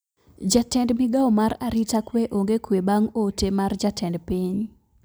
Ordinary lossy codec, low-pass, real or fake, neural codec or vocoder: none; none; real; none